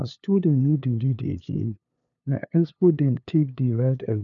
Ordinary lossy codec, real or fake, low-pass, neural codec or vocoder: none; fake; 7.2 kHz; codec, 16 kHz, 2 kbps, FunCodec, trained on LibriTTS, 25 frames a second